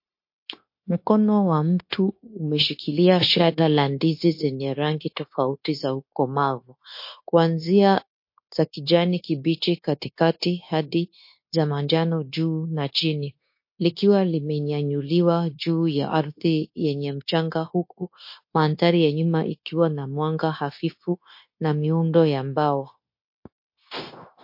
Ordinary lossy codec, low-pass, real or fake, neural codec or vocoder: MP3, 32 kbps; 5.4 kHz; fake; codec, 16 kHz, 0.9 kbps, LongCat-Audio-Codec